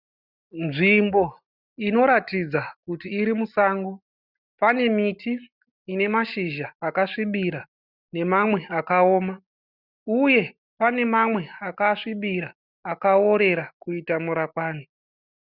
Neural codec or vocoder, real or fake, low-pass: none; real; 5.4 kHz